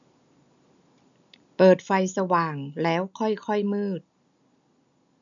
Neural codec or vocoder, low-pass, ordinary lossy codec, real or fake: none; 7.2 kHz; none; real